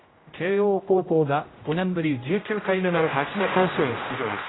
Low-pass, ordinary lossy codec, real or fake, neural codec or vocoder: 7.2 kHz; AAC, 16 kbps; fake; codec, 16 kHz, 0.5 kbps, X-Codec, HuBERT features, trained on general audio